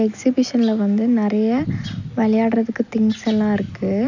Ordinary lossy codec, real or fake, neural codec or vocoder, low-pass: none; real; none; 7.2 kHz